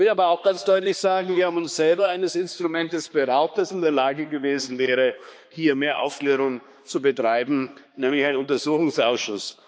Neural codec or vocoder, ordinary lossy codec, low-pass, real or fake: codec, 16 kHz, 2 kbps, X-Codec, HuBERT features, trained on balanced general audio; none; none; fake